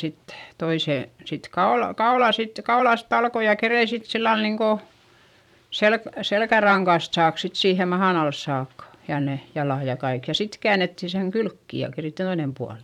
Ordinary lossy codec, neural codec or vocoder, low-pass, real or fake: none; vocoder, 44.1 kHz, 128 mel bands every 512 samples, BigVGAN v2; 19.8 kHz; fake